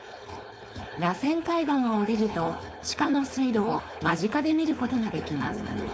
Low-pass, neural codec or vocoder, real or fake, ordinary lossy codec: none; codec, 16 kHz, 4.8 kbps, FACodec; fake; none